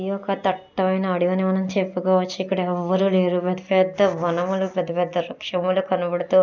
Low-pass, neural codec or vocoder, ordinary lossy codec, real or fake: 7.2 kHz; none; none; real